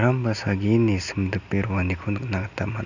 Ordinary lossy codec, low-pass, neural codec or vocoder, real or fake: none; 7.2 kHz; none; real